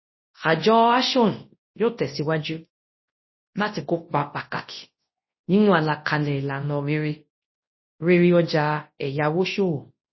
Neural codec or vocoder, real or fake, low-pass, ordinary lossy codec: codec, 24 kHz, 0.9 kbps, WavTokenizer, large speech release; fake; 7.2 kHz; MP3, 24 kbps